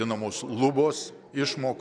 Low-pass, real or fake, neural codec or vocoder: 9.9 kHz; fake; vocoder, 44.1 kHz, 128 mel bands every 256 samples, BigVGAN v2